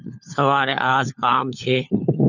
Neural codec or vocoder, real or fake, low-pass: codec, 16 kHz, 4 kbps, FunCodec, trained on LibriTTS, 50 frames a second; fake; 7.2 kHz